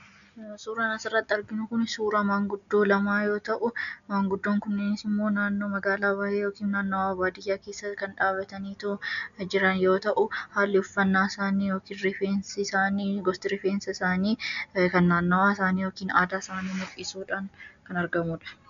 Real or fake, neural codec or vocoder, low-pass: real; none; 7.2 kHz